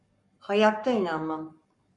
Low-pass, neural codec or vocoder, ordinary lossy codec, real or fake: 10.8 kHz; codec, 44.1 kHz, 7.8 kbps, Pupu-Codec; MP3, 48 kbps; fake